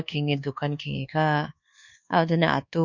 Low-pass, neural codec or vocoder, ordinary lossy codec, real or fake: 7.2 kHz; codec, 24 kHz, 1.2 kbps, DualCodec; none; fake